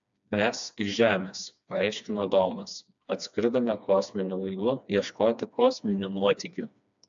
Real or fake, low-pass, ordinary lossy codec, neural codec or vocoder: fake; 7.2 kHz; AAC, 64 kbps; codec, 16 kHz, 2 kbps, FreqCodec, smaller model